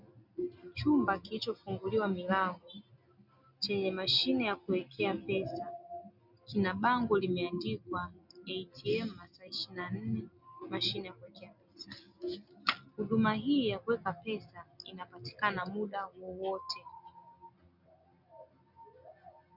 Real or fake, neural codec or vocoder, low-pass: real; none; 5.4 kHz